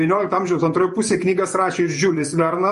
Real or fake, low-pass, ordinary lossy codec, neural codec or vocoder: fake; 14.4 kHz; MP3, 48 kbps; vocoder, 48 kHz, 128 mel bands, Vocos